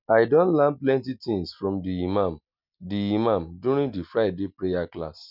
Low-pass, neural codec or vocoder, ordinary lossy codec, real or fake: 5.4 kHz; none; none; real